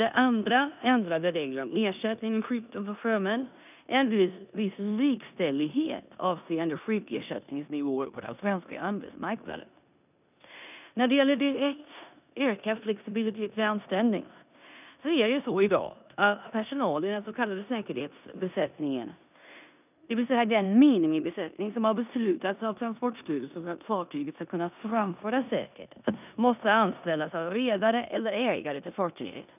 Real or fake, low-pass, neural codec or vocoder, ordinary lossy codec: fake; 3.6 kHz; codec, 16 kHz in and 24 kHz out, 0.9 kbps, LongCat-Audio-Codec, four codebook decoder; none